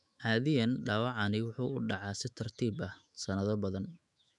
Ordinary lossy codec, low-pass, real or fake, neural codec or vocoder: none; 10.8 kHz; fake; autoencoder, 48 kHz, 128 numbers a frame, DAC-VAE, trained on Japanese speech